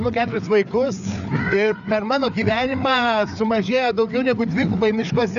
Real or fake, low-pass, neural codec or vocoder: fake; 7.2 kHz; codec, 16 kHz, 4 kbps, FreqCodec, larger model